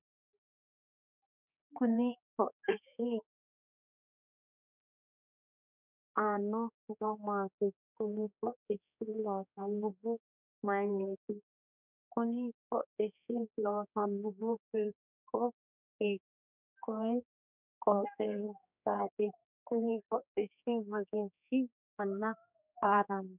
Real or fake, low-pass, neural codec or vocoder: fake; 3.6 kHz; codec, 16 kHz, 4 kbps, X-Codec, HuBERT features, trained on general audio